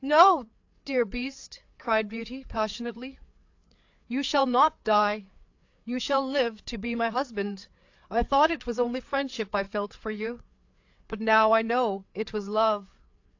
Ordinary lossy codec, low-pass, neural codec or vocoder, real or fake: AAC, 48 kbps; 7.2 kHz; codec, 16 kHz, 4 kbps, FreqCodec, larger model; fake